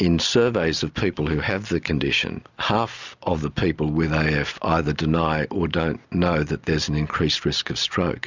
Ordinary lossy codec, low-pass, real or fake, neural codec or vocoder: Opus, 64 kbps; 7.2 kHz; real; none